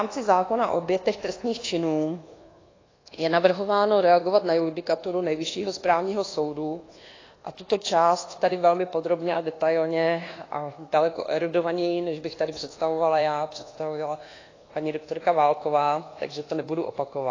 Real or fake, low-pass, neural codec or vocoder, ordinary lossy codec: fake; 7.2 kHz; codec, 24 kHz, 1.2 kbps, DualCodec; AAC, 32 kbps